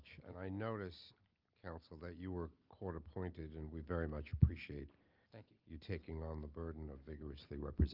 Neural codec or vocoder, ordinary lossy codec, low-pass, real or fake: none; Opus, 32 kbps; 5.4 kHz; real